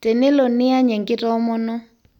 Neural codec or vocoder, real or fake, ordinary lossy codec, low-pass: none; real; none; 19.8 kHz